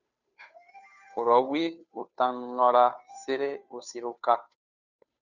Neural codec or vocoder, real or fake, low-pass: codec, 16 kHz, 2 kbps, FunCodec, trained on Chinese and English, 25 frames a second; fake; 7.2 kHz